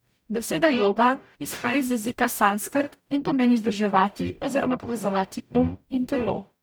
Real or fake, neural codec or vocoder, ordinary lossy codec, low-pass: fake; codec, 44.1 kHz, 0.9 kbps, DAC; none; none